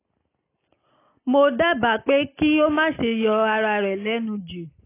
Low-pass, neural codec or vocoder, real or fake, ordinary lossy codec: 3.6 kHz; none; real; AAC, 24 kbps